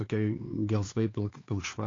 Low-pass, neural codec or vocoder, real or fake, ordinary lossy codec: 7.2 kHz; codec, 16 kHz, 4 kbps, X-Codec, HuBERT features, trained on LibriSpeech; fake; AAC, 32 kbps